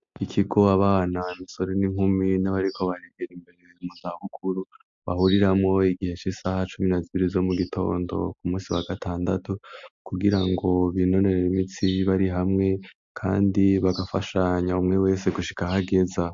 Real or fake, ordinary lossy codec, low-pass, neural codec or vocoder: real; MP3, 48 kbps; 7.2 kHz; none